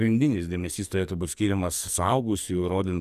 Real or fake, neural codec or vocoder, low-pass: fake; codec, 44.1 kHz, 2.6 kbps, SNAC; 14.4 kHz